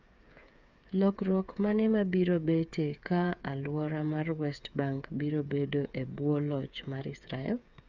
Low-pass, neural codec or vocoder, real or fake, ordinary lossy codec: 7.2 kHz; codec, 16 kHz, 8 kbps, FreqCodec, smaller model; fake; none